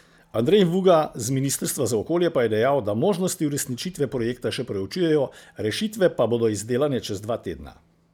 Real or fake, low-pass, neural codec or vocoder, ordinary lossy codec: real; 19.8 kHz; none; none